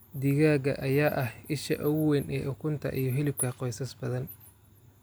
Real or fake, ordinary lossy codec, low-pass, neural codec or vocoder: real; none; none; none